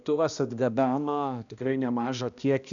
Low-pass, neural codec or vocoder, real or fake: 7.2 kHz; codec, 16 kHz, 1 kbps, X-Codec, HuBERT features, trained on balanced general audio; fake